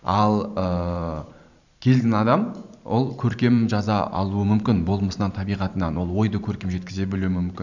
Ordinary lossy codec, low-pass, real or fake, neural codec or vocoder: none; 7.2 kHz; fake; vocoder, 44.1 kHz, 128 mel bands every 512 samples, BigVGAN v2